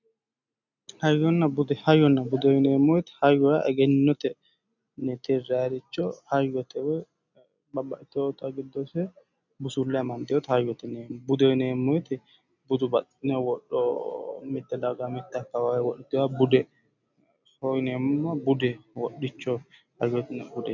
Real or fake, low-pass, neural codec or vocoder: real; 7.2 kHz; none